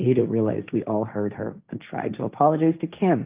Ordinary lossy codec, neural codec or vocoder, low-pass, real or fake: Opus, 24 kbps; codec, 16 kHz, 1.1 kbps, Voila-Tokenizer; 3.6 kHz; fake